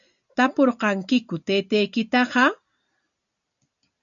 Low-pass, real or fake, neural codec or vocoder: 7.2 kHz; real; none